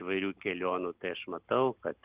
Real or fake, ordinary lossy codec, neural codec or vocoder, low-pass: real; Opus, 32 kbps; none; 3.6 kHz